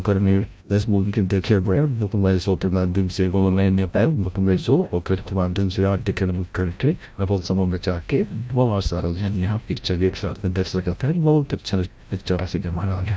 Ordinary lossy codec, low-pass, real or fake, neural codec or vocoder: none; none; fake; codec, 16 kHz, 0.5 kbps, FreqCodec, larger model